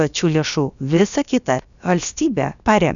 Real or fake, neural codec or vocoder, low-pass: fake; codec, 16 kHz, about 1 kbps, DyCAST, with the encoder's durations; 7.2 kHz